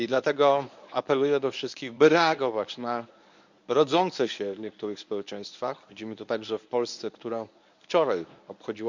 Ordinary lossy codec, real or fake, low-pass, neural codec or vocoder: none; fake; 7.2 kHz; codec, 24 kHz, 0.9 kbps, WavTokenizer, medium speech release version 1